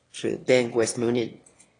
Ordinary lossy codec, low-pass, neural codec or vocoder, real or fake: AAC, 32 kbps; 9.9 kHz; autoencoder, 22.05 kHz, a latent of 192 numbers a frame, VITS, trained on one speaker; fake